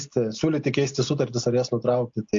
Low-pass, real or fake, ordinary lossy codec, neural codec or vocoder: 7.2 kHz; real; MP3, 48 kbps; none